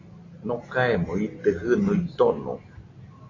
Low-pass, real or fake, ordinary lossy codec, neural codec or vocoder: 7.2 kHz; real; AAC, 32 kbps; none